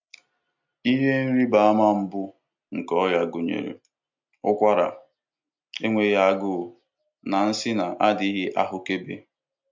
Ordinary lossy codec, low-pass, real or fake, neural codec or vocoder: MP3, 64 kbps; 7.2 kHz; real; none